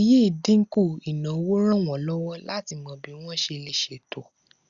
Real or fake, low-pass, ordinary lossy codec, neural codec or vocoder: real; 7.2 kHz; Opus, 64 kbps; none